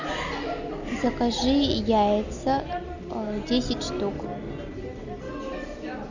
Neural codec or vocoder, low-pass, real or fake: none; 7.2 kHz; real